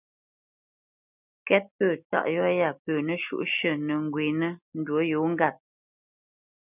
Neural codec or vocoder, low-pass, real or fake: none; 3.6 kHz; real